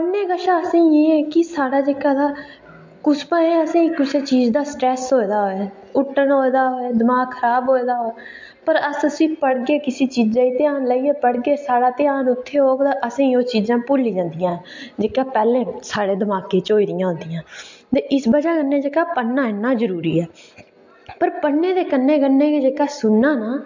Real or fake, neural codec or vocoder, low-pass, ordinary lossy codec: real; none; 7.2 kHz; MP3, 48 kbps